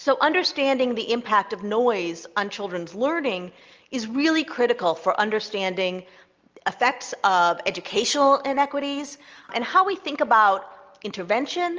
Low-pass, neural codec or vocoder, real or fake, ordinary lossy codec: 7.2 kHz; none; real; Opus, 24 kbps